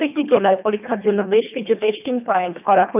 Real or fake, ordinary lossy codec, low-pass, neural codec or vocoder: fake; none; 3.6 kHz; codec, 24 kHz, 1.5 kbps, HILCodec